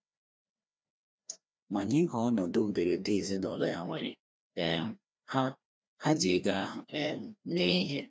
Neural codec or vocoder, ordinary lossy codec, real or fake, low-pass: codec, 16 kHz, 1 kbps, FreqCodec, larger model; none; fake; none